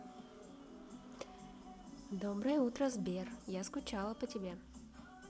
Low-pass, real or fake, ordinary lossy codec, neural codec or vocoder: none; real; none; none